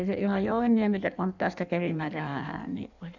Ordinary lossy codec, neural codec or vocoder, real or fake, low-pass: none; codec, 16 kHz in and 24 kHz out, 1.1 kbps, FireRedTTS-2 codec; fake; 7.2 kHz